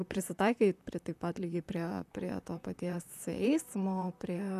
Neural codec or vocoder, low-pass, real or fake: vocoder, 44.1 kHz, 128 mel bands, Pupu-Vocoder; 14.4 kHz; fake